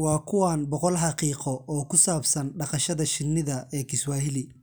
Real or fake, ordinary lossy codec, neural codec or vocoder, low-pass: real; none; none; none